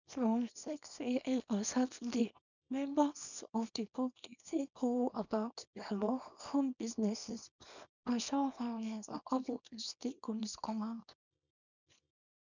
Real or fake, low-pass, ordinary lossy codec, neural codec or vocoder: fake; 7.2 kHz; none; codec, 24 kHz, 0.9 kbps, WavTokenizer, small release